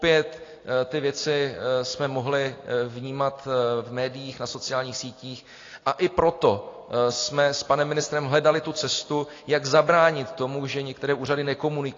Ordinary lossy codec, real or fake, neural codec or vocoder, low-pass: AAC, 32 kbps; real; none; 7.2 kHz